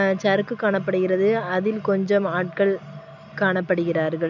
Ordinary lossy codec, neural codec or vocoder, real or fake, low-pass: none; none; real; 7.2 kHz